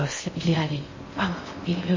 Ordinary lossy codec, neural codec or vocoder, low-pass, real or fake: MP3, 32 kbps; codec, 16 kHz in and 24 kHz out, 0.6 kbps, FocalCodec, streaming, 4096 codes; 7.2 kHz; fake